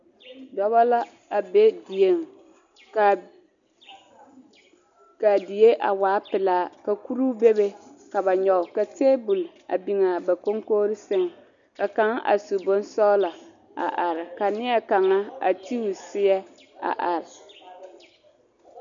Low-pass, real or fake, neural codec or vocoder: 7.2 kHz; real; none